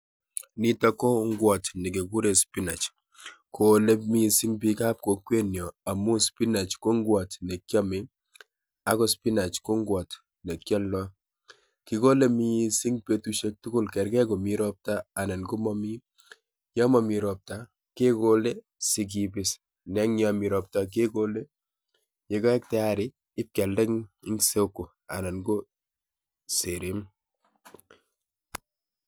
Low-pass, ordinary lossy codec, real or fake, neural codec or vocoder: none; none; real; none